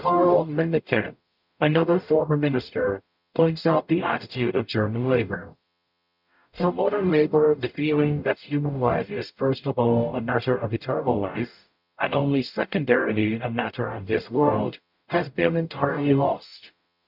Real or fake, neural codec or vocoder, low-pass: fake; codec, 44.1 kHz, 0.9 kbps, DAC; 5.4 kHz